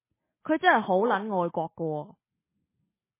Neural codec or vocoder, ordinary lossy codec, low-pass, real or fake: none; MP3, 16 kbps; 3.6 kHz; real